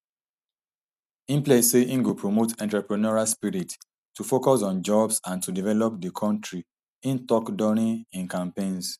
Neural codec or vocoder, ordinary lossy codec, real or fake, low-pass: none; none; real; 14.4 kHz